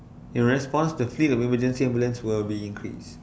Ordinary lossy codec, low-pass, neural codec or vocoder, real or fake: none; none; none; real